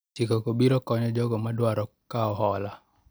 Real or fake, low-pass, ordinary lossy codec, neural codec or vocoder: real; none; none; none